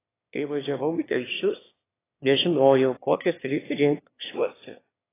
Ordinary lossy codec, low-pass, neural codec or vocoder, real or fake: AAC, 16 kbps; 3.6 kHz; autoencoder, 22.05 kHz, a latent of 192 numbers a frame, VITS, trained on one speaker; fake